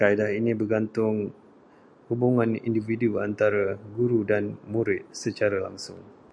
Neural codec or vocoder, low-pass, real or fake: none; 9.9 kHz; real